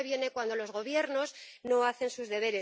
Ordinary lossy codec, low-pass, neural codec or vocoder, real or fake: none; none; none; real